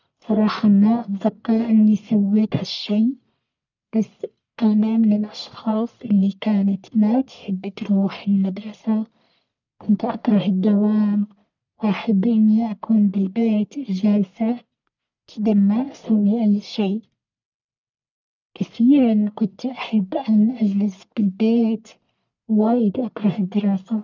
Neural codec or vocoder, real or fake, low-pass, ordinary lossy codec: codec, 44.1 kHz, 1.7 kbps, Pupu-Codec; fake; 7.2 kHz; none